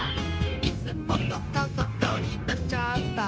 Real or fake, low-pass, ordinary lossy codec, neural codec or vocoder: fake; none; none; codec, 16 kHz, 0.9 kbps, LongCat-Audio-Codec